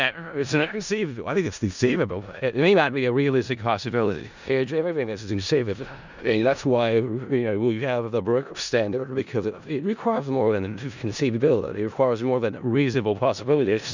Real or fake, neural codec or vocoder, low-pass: fake; codec, 16 kHz in and 24 kHz out, 0.4 kbps, LongCat-Audio-Codec, four codebook decoder; 7.2 kHz